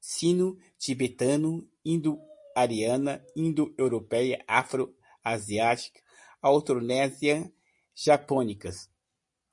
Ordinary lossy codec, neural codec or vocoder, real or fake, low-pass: MP3, 48 kbps; none; real; 10.8 kHz